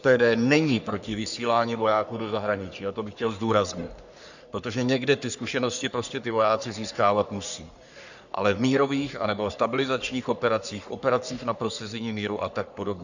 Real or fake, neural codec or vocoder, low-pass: fake; codec, 44.1 kHz, 3.4 kbps, Pupu-Codec; 7.2 kHz